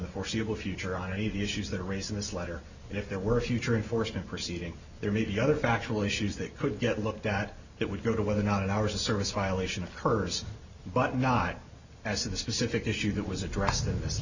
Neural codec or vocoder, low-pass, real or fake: none; 7.2 kHz; real